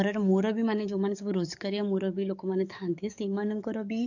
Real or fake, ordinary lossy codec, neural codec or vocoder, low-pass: fake; none; codec, 44.1 kHz, 7.8 kbps, DAC; 7.2 kHz